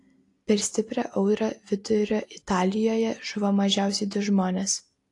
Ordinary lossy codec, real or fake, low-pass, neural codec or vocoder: AAC, 48 kbps; real; 10.8 kHz; none